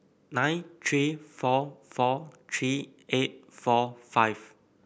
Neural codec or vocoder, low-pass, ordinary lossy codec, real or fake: none; none; none; real